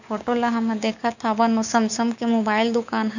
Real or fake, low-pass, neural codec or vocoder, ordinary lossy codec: fake; 7.2 kHz; codec, 16 kHz, 6 kbps, DAC; none